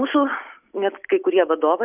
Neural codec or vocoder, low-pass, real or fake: none; 3.6 kHz; real